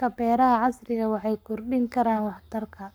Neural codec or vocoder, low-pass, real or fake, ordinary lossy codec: codec, 44.1 kHz, 7.8 kbps, Pupu-Codec; none; fake; none